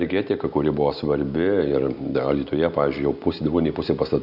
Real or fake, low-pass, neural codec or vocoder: real; 5.4 kHz; none